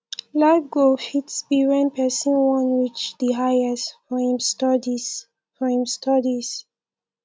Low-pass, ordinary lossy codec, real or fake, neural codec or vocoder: none; none; real; none